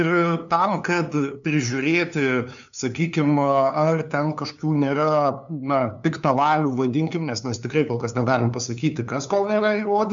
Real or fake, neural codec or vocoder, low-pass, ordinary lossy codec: fake; codec, 16 kHz, 2 kbps, FunCodec, trained on LibriTTS, 25 frames a second; 7.2 kHz; MP3, 64 kbps